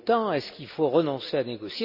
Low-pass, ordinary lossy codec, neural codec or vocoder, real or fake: 5.4 kHz; none; none; real